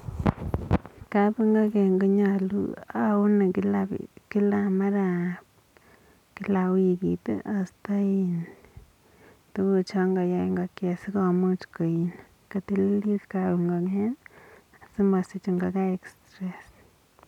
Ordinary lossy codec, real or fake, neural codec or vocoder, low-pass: none; real; none; 19.8 kHz